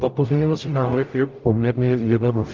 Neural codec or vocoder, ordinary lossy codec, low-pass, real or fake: codec, 44.1 kHz, 0.9 kbps, DAC; Opus, 24 kbps; 7.2 kHz; fake